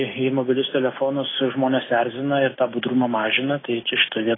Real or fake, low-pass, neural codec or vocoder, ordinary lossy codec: real; 7.2 kHz; none; AAC, 16 kbps